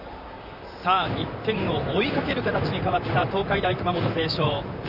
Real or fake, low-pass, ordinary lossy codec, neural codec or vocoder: fake; 5.4 kHz; none; vocoder, 44.1 kHz, 128 mel bands, Pupu-Vocoder